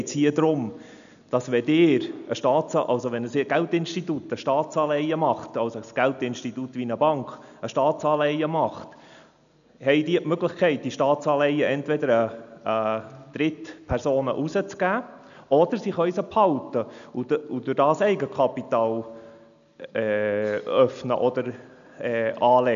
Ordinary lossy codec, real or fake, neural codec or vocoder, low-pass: none; real; none; 7.2 kHz